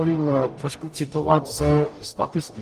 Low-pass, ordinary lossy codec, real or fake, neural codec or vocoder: 14.4 kHz; Opus, 32 kbps; fake; codec, 44.1 kHz, 0.9 kbps, DAC